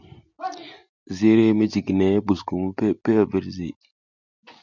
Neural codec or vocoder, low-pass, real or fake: none; 7.2 kHz; real